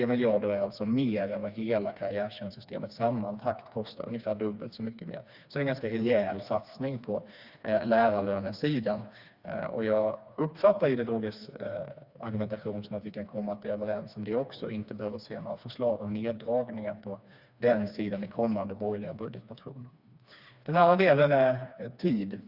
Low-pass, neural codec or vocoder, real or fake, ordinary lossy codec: 5.4 kHz; codec, 16 kHz, 2 kbps, FreqCodec, smaller model; fake; Opus, 64 kbps